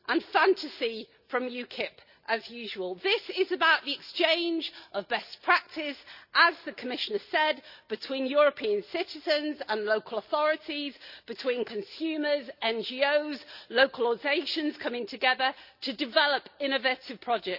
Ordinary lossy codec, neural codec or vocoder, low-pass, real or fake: none; none; 5.4 kHz; real